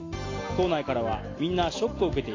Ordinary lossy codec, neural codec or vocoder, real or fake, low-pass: AAC, 32 kbps; none; real; 7.2 kHz